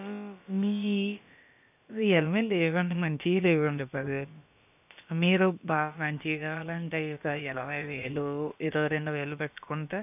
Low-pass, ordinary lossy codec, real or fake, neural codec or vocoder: 3.6 kHz; none; fake; codec, 16 kHz, about 1 kbps, DyCAST, with the encoder's durations